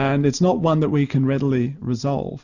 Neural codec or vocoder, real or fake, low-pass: none; real; 7.2 kHz